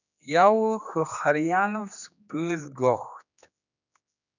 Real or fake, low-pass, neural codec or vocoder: fake; 7.2 kHz; codec, 16 kHz, 2 kbps, X-Codec, HuBERT features, trained on general audio